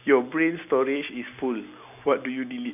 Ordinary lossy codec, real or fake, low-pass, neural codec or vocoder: none; real; 3.6 kHz; none